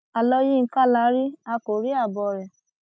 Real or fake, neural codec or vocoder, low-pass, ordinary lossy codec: real; none; none; none